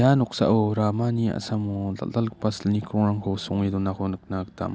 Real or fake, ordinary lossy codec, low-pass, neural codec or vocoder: real; none; none; none